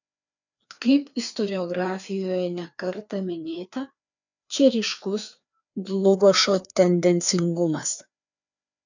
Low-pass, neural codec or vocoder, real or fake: 7.2 kHz; codec, 16 kHz, 2 kbps, FreqCodec, larger model; fake